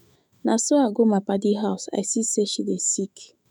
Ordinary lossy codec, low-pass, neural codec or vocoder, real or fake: none; none; autoencoder, 48 kHz, 128 numbers a frame, DAC-VAE, trained on Japanese speech; fake